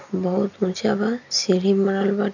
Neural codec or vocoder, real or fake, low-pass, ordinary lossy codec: none; real; 7.2 kHz; none